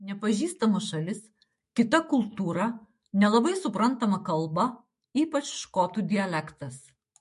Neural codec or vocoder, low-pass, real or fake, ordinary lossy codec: autoencoder, 48 kHz, 128 numbers a frame, DAC-VAE, trained on Japanese speech; 14.4 kHz; fake; MP3, 48 kbps